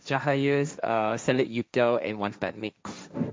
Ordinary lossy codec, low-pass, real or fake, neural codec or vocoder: none; none; fake; codec, 16 kHz, 1.1 kbps, Voila-Tokenizer